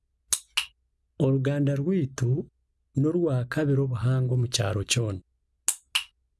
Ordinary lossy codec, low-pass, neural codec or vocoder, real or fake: none; none; none; real